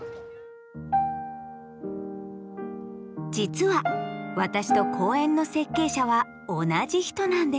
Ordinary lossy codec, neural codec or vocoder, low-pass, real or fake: none; none; none; real